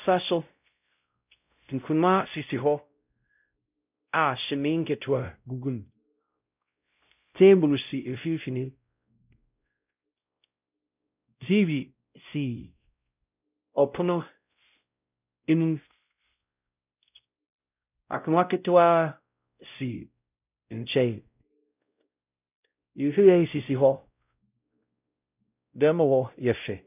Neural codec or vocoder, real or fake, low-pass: codec, 16 kHz, 0.5 kbps, X-Codec, WavLM features, trained on Multilingual LibriSpeech; fake; 3.6 kHz